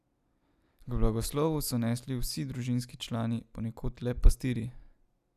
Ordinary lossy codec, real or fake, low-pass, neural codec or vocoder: none; real; 14.4 kHz; none